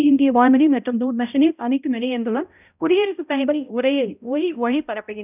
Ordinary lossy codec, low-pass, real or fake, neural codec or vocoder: none; 3.6 kHz; fake; codec, 16 kHz, 0.5 kbps, X-Codec, HuBERT features, trained on balanced general audio